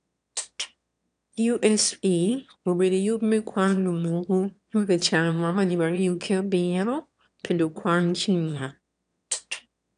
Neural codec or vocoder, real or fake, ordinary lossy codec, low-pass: autoencoder, 22.05 kHz, a latent of 192 numbers a frame, VITS, trained on one speaker; fake; none; 9.9 kHz